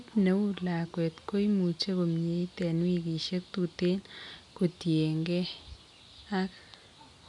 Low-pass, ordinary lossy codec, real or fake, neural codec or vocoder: 10.8 kHz; none; real; none